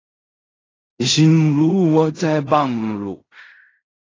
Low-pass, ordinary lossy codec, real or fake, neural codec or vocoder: 7.2 kHz; AAC, 32 kbps; fake; codec, 16 kHz in and 24 kHz out, 0.4 kbps, LongCat-Audio-Codec, fine tuned four codebook decoder